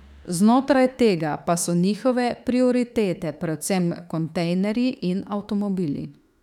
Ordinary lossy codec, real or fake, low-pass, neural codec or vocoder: none; fake; 19.8 kHz; autoencoder, 48 kHz, 32 numbers a frame, DAC-VAE, trained on Japanese speech